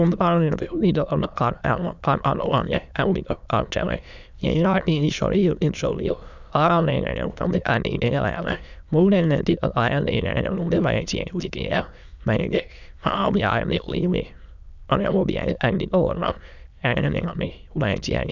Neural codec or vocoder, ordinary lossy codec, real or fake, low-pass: autoencoder, 22.05 kHz, a latent of 192 numbers a frame, VITS, trained on many speakers; none; fake; 7.2 kHz